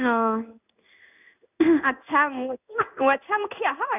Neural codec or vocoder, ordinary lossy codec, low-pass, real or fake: codec, 16 kHz in and 24 kHz out, 1 kbps, XY-Tokenizer; none; 3.6 kHz; fake